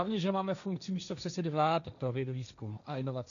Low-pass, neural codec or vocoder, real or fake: 7.2 kHz; codec, 16 kHz, 1.1 kbps, Voila-Tokenizer; fake